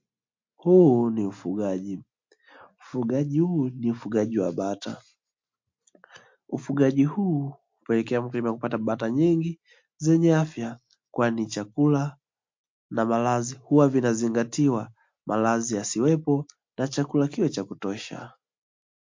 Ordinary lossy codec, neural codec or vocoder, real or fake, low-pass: MP3, 48 kbps; none; real; 7.2 kHz